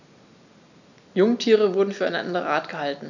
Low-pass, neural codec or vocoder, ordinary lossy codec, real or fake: 7.2 kHz; none; none; real